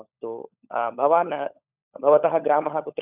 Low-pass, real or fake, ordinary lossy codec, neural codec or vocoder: 3.6 kHz; fake; none; codec, 16 kHz, 16 kbps, FunCodec, trained on LibriTTS, 50 frames a second